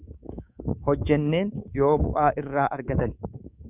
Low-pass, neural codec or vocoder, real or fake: 3.6 kHz; codec, 24 kHz, 3.1 kbps, DualCodec; fake